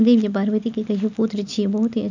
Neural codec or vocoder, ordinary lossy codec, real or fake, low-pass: none; none; real; 7.2 kHz